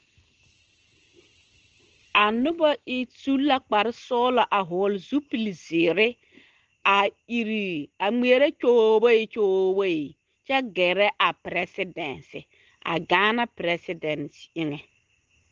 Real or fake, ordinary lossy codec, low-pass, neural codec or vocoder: real; Opus, 16 kbps; 7.2 kHz; none